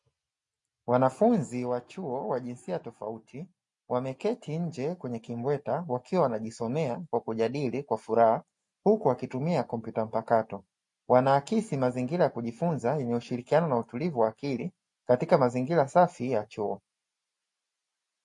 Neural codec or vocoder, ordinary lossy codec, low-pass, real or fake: none; MP3, 48 kbps; 10.8 kHz; real